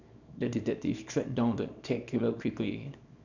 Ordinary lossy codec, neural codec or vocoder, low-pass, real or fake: none; codec, 24 kHz, 0.9 kbps, WavTokenizer, small release; 7.2 kHz; fake